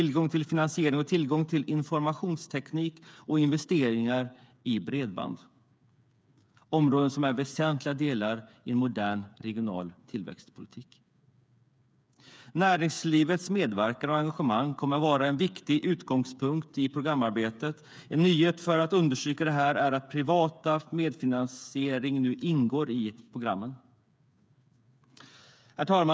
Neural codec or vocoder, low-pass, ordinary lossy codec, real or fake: codec, 16 kHz, 8 kbps, FreqCodec, smaller model; none; none; fake